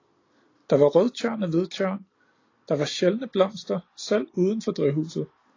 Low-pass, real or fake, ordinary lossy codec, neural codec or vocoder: 7.2 kHz; real; AAC, 32 kbps; none